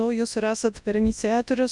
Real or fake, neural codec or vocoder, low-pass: fake; codec, 24 kHz, 0.9 kbps, WavTokenizer, large speech release; 10.8 kHz